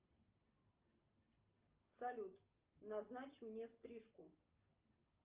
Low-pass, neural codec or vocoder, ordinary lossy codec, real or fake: 3.6 kHz; none; Opus, 32 kbps; real